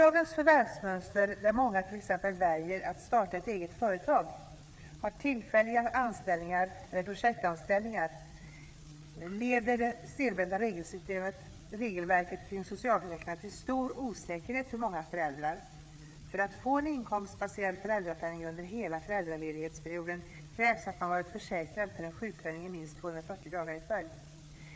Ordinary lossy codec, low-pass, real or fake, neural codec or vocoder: none; none; fake; codec, 16 kHz, 4 kbps, FreqCodec, larger model